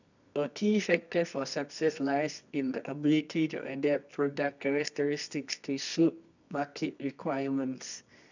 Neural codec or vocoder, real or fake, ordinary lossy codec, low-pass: codec, 24 kHz, 0.9 kbps, WavTokenizer, medium music audio release; fake; none; 7.2 kHz